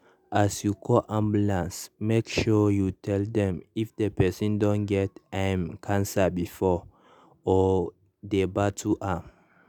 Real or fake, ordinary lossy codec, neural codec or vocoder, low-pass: real; none; none; 19.8 kHz